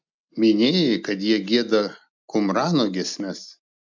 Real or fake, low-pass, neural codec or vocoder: real; 7.2 kHz; none